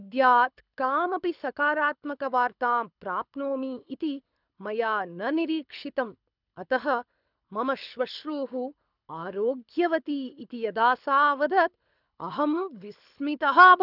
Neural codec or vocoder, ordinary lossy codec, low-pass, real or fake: codec, 24 kHz, 6 kbps, HILCodec; none; 5.4 kHz; fake